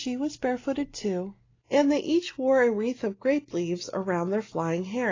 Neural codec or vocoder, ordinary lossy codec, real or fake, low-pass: none; AAC, 32 kbps; real; 7.2 kHz